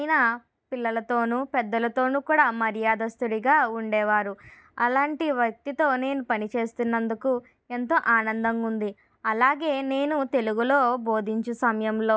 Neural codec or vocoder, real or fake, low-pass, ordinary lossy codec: none; real; none; none